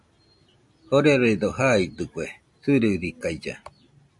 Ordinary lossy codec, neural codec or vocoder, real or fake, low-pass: MP3, 64 kbps; none; real; 10.8 kHz